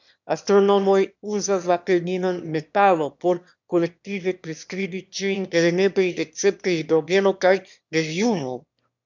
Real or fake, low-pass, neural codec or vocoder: fake; 7.2 kHz; autoencoder, 22.05 kHz, a latent of 192 numbers a frame, VITS, trained on one speaker